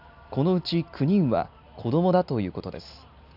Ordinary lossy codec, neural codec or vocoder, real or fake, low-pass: none; none; real; 5.4 kHz